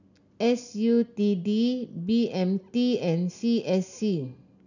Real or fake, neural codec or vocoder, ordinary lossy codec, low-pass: real; none; none; 7.2 kHz